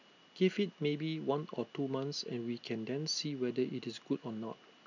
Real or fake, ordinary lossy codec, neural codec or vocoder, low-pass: real; none; none; 7.2 kHz